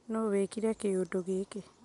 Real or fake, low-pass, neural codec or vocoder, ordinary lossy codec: real; 10.8 kHz; none; none